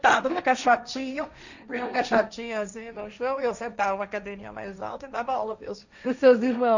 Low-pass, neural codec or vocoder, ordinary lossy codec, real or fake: 7.2 kHz; codec, 16 kHz, 1.1 kbps, Voila-Tokenizer; none; fake